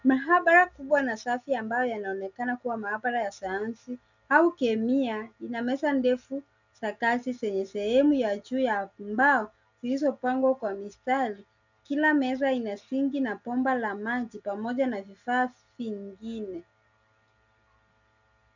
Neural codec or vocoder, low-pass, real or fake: none; 7.2 kHz; real